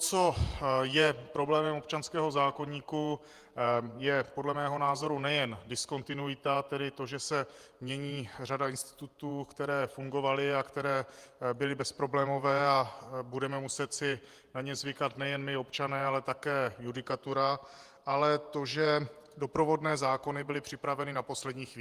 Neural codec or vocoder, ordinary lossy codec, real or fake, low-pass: vocoder, 48 kHz, 128 mel bands, Vocos; Opus, 32 kbps; fake; 14.4 kHz